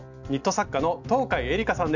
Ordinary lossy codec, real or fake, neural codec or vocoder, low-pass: none; real; none; 7.2 kHz